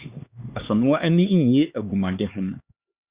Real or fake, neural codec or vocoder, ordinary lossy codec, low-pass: fake; codec, 16 kHz, 2 kbps, X-Codec, HuBERT features, trained on LibriSpeech; Opus, 64 kbps; 3.6 kHz